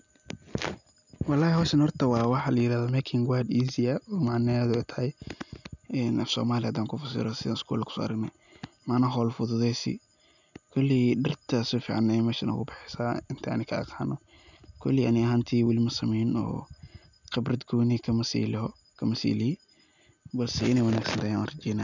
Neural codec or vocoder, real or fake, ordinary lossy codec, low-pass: none; real; none; 7.2 kHz